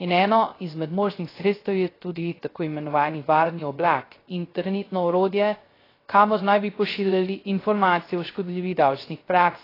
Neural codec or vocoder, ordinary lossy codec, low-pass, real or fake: codec, 16 kHz, 0.3 kbps, FocalCodec; AAC, 24 kbps; 5.4 kHz; fake